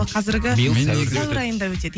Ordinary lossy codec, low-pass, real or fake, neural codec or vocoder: none; none; real; none